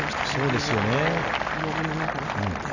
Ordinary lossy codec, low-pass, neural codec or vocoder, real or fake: none; 7.2 kHz; none; real